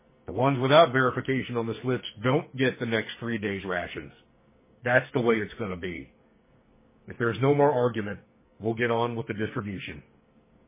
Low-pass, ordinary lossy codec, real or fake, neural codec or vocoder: 3.6 kHz; MP3, 16 kbps; fake; codec, 44.1 kHz, 2.6 kbps, SNAC